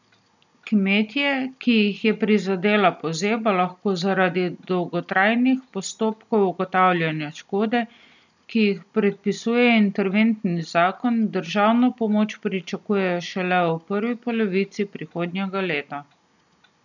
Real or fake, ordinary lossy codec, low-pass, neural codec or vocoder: real; none; 7.2 kHz; none